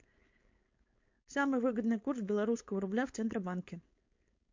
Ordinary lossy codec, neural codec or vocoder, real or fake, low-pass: MP3, 48 kbps; codec, 16 kHz, 4.8 kbps, FACodec; fake; 7.2 kHz